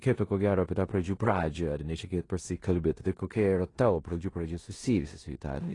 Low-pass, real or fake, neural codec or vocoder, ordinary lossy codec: 10.8 kHz; fake; codec, 16 kHz in and 24 kHz out, 0.9 kbps, LongCat-Audio-Codec, four codebook decoder; AAC, 32 kbps